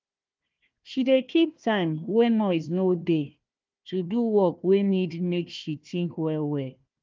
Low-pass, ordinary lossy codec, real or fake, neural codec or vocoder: 7.2 kHz; Opus, 24 kbps; fake; codec, 16 kHz, 1 kbps, FunCodec, trained on Chinese and English, 50 frames a second